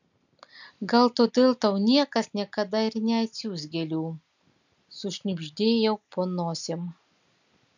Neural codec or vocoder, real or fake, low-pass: none; real; 7.2 kHz